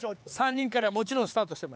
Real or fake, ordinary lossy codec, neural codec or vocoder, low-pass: fake; none; codec, 16 kHz, 4 kbps, X-Codec, HuBERT features, trained on LibriSpeech; none